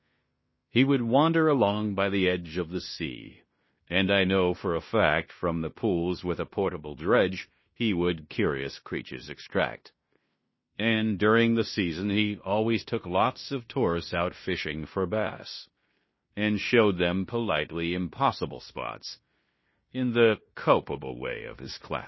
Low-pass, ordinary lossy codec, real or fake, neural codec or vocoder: 7.2 kHz; MP3, 24 kbps; fake; codec, 16 kHz in and 24 kHz out, 0.9 kbps, LongCat-Audio-Codec, fine tuned four codebook decoder